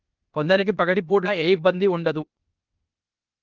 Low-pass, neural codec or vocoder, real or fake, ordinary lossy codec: 7.2 kHz; codec, 16 kHz, 0.8 kbps, ZipCodec; fake; Opus, 24 kbps